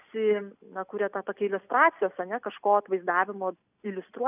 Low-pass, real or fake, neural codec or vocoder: 3.6 kHz; real; none